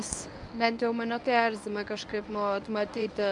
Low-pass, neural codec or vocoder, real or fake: 10.8 kHz; codec, 24 kHz, 0.9 kbps, WavTokenizer, medium speech release version 1; fake